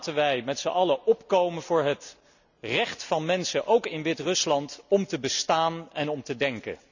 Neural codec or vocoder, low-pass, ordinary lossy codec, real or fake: none; 7.2 kHz; none; real